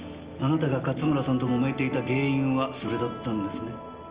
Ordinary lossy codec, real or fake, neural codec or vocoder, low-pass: Opus, 32 kbps; real; none; 3.6 kHz